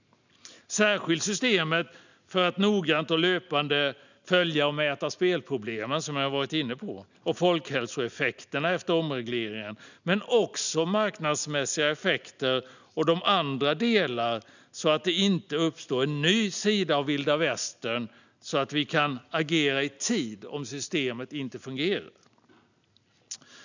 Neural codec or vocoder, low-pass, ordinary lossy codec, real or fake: none; 7.2 kHz; none; real